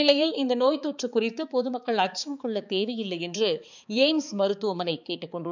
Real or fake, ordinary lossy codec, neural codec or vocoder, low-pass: fake; none; codec, 16 kHz, 4 kbps, X-Codec, HuBERT features, trained on balanced general audio; 7.2 kHz